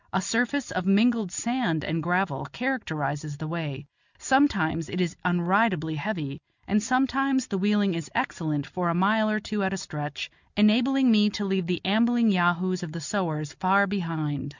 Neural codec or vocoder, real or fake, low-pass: none; real; 7.2 kHz